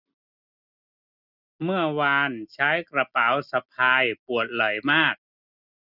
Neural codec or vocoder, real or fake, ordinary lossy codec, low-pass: none; real; none; 5.4 kHz